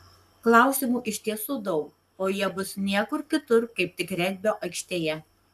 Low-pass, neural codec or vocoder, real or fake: 14.4 kHz; codec, 44.1 kHz, 7.8 kbps, Pupu-Codec; fake